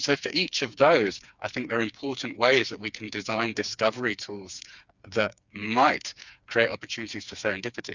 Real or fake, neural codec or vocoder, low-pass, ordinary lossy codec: fake; codec, 16 kHz, 4 kbps, FreqCodec, smaller model; 7.2 kHz; Opus, 64 kbps